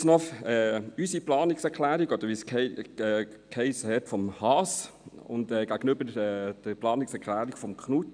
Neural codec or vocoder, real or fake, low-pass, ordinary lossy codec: none; real; 9.9 kHz; none